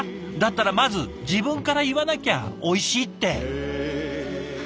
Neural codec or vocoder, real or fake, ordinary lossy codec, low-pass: none; real; none; none